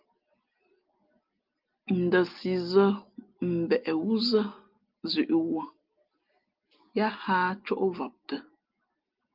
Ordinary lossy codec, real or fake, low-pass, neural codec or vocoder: Opus, 32 kbps; real; 5.4 kHz; none